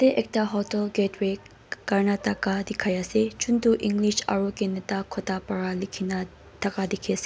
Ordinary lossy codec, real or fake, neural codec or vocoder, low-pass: none; real; none; none